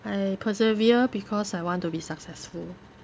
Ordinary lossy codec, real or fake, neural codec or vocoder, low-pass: none; real; none; none